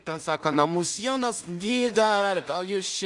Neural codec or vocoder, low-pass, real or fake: codec, 16 kHz in and 24 kHz out, 0.4 kbps, LongCat-Audio-Codec, two codebook decoder; 10.8 kHz; fake